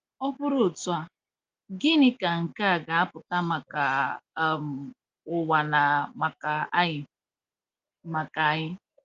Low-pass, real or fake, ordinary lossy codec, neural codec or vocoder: 7.2 kHz; real; Opus, 32 kbps; none